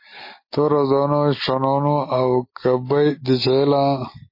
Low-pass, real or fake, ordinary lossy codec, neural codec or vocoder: 5.4 kHz; real; MP3, 24 kbps; none